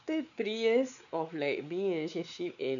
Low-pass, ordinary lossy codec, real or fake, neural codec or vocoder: 7.2 kHz; none; fake; codec, 16 kHz, 4 kbps, X-Codec, WavLM features, trained on Multilingual LibriSpeech